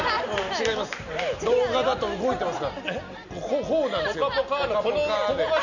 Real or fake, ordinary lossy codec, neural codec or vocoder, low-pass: real; none; none; 7.2 kHz